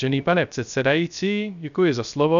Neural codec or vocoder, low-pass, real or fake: codec, 16 kHz, 0.3 kbps, FocalCodec; 7.2 kHz; fake